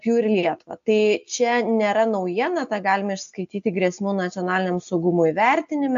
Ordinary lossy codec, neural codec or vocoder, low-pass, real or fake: AAC, 64 kbps; none; 7.2 kHz; real